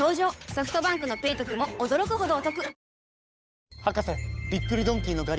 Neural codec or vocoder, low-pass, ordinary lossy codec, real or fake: codec, 16 kHz, 8 kbps, FunCodec, trained on Chinese and English, 25 frames a second; none; none; fake